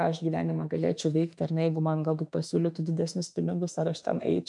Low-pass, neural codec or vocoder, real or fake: 10.8 kHz; autoencoder, 48 kHz, 32 numbers a frame, DAC-VAE, trained on Japanese speech; fake